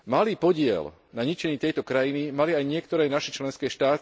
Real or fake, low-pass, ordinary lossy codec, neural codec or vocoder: real; none; none; none